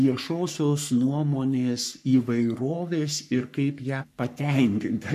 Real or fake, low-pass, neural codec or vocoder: fake; 14.4 kHz; codec, 44.1 kHz, 3.4 kbps, Pupu-Codec